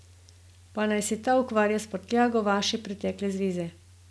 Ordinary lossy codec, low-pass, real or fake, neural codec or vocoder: none; none; real; none